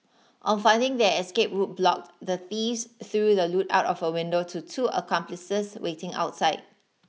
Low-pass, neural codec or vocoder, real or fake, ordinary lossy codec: none; none; real; none